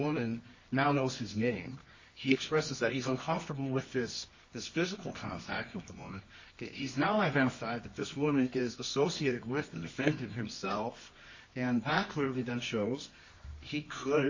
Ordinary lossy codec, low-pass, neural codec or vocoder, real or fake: MP3, 32 kbps; 7.2 kHz; codec, 24 kHz, 0.9 kbps, WavTokenizer, medium music audio release; fake